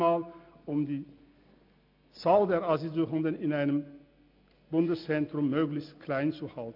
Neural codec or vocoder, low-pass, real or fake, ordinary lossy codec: none; 5.4 kHz; real; MP3, 48 kbps